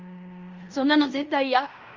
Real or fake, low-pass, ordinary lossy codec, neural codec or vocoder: fake; 7.2 kHz; Opus, 32 kbps; codec, 16 kHz in and 24 kHz out, 0.9 kbps, LongCat-Audio-Codec, fine tuned four codebook decoder